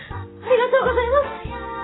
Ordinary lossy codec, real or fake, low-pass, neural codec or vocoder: AAC, 16 kbps; real; 7.2 kHz; none